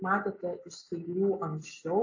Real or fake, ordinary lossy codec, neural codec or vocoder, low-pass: real; AAC, 48 kbps; none; 7.2 kHz